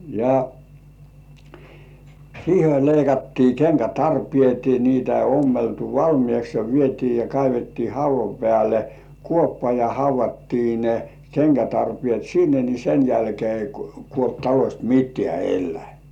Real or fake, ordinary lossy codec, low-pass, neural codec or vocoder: real; none; 19.8 kHz; none